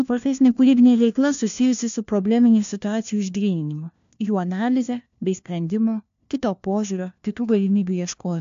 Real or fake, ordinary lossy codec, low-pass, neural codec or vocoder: fake; AAC, 64 kbps; 7.2 kHz; codec, 16 kHz, 1 kbps, FunCodec, trained on LibriTTS, 50 frames a second